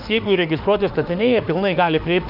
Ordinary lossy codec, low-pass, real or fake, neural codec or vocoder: Opus, 64 kbps; 5.4 kHz; fake; autoencoder, 48 kHz, 32 numbers a frame, DAC-VAE, trained on Japanese speech